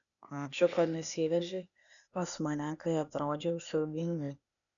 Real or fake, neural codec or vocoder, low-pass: fake; codec, 16 kHz, 0.8 kbps, ZipCodec; 7.2 kHz